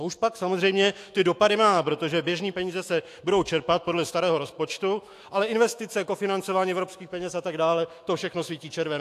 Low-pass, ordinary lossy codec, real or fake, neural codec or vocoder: 14.4 kHz; AAC, 64 kbps; fake; autoencoder, 48 kHz, 128 numbers a frame, DAC-VAE, trained on Japanese speech